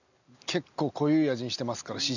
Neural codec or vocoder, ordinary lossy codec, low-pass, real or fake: none; none; 7.2 kHz; real